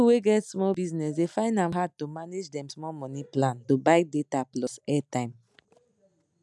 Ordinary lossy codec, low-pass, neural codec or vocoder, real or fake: none; none; none; real